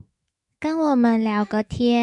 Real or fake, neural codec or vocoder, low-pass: fake; autoencoder, 48 kHz, 128 numbers a frame, DAC-VAE, trained on Japanese speech; 10.8 kHz